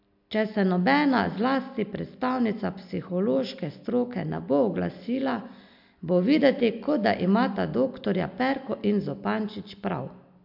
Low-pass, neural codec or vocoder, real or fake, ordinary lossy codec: 5.4 kHz; none; real; MP3, 48 kbps